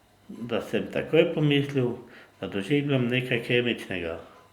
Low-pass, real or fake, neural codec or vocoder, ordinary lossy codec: 19.8 kHz; real; none; Opus, 64 kbps